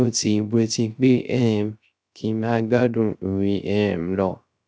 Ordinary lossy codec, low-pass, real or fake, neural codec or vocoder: none; none; fake; codec, 16 kHz, 0.3 kbps, FocalCodec